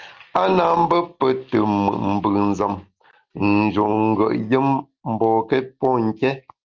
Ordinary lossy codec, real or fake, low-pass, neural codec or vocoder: Opus, 24 kbps; real; 7.2 kHz; none